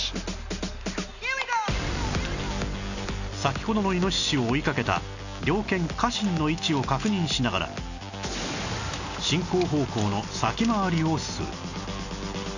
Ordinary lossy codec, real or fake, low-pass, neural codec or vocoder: none; real; 7.2 kHz; none